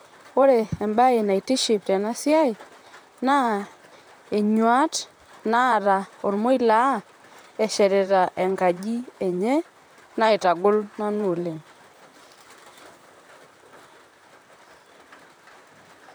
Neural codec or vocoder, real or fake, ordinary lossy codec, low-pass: vocoder, 44.1 kHz, 128 mel bands, Pupu-Vocoder; fake; none; none